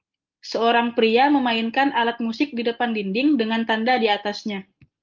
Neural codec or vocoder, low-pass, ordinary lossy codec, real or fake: none; 7.2 kHz; Opus, 32 kbps; real